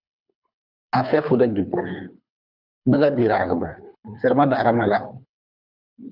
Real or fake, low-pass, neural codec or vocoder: fake; 5.4 kHz; codec, 24 kHz, 3 kbps, HILCodec